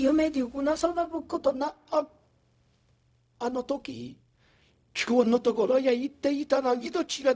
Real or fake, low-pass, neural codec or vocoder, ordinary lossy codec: fake; none; codec, 16 kHz, 0.4 kbps, LongCat-Audio-Codec; none